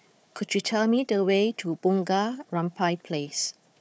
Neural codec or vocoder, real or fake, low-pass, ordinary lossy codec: codec, 16 kHz, 4 kbps, FunCodec, trained on Chinese and English, 50 frames a second; fake; none; none